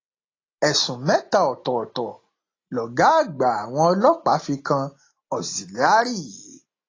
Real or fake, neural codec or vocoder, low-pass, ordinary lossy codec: real; none; 7.2 kHz; AAC, 32 kbps